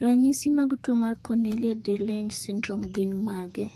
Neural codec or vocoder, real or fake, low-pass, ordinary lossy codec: codec, 32 kHz, 1.9 kbps, SNAC; fake; 14.4 kHz; AAC, 64 kbps